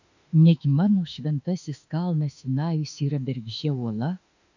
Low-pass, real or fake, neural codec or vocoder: 7.2 kHz; fake; autoencoder, 48 kHz, 32 numbers a frame, DAC-VAE, trained on Japanese speech